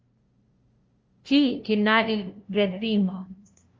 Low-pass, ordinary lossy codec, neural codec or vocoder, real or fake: 7.2 kHz; Opus, 24 kbps; codec, 16 kHz, 0.5 kbps, FunCodec, trained on LibriTTS, 25 frames a second; fake